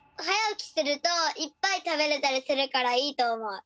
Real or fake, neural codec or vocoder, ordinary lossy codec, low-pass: real; none; none; none